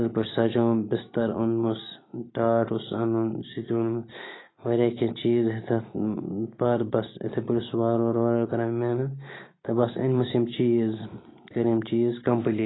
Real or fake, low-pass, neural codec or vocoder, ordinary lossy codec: real; 7.2 kHz; none; AAC, 16 kbps